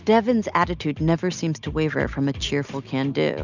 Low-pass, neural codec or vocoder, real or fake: 7.2 kHz; none; real